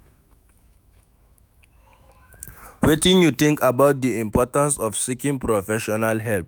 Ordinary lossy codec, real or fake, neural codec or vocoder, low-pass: none; fake; autoencoder, 48 kHz, 128 numbers a frame, DAC-VAE, trained on Japanese speech; none